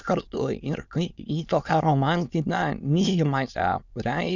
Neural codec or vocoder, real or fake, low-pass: autoencoder, 22.05 kHz, a latent of 192 numbers a frame, VITS, trained on many speakers; fake; 7.2 kHz